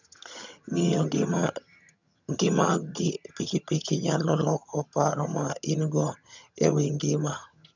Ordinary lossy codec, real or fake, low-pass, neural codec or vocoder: none; fake; 7.2 kHz; vocoder, 22.05 kHz, 80 mel bands, HiFi-GAN